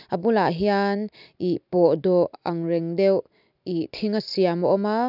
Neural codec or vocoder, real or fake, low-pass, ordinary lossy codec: none; real; 5.4 kHz; none